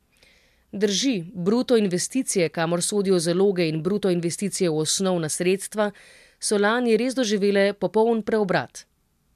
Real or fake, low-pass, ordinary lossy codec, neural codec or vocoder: real; 14.4 kHz; MP3, 96 kbps; none